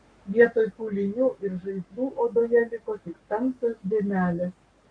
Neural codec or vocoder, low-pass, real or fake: codec, 44.1 kHz, 7.8 kbps, Pupu-Codec; 9.9 kHz; fake